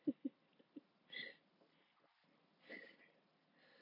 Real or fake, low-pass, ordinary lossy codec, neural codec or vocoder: real; 5.4 kHz; none; none